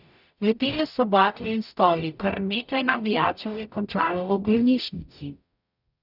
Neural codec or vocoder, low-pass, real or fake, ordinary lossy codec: codec, 44.1 kHz, 0.9 kbps, DAC; 5.4 kHz; fake; none